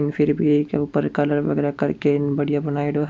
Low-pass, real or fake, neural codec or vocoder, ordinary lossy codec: none; real; none; none